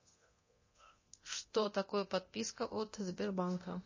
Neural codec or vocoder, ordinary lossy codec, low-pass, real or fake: codec, 24 kHz, 0.9 kbps, DualCodec; MP3, 32 kbps; 7.2 kHz; fake